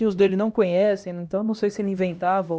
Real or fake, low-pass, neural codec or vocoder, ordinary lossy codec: fake; none; codec, 16 kHz, 1 kbps, X-Codec, HuBERT features, trained on LibriSpeech; none